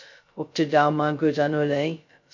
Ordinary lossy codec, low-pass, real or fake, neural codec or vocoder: MP3, 48 kbps; 7.2 kHz; fake; codec, 16 kHz, 0.2 kbps, FocalCodec